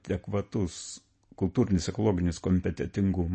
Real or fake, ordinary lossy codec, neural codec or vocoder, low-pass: real; MP3, 32 kbps; none; 10.8 kHz